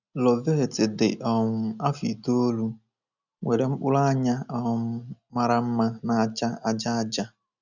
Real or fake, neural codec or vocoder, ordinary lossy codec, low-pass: real; none; none; 7.2 kHz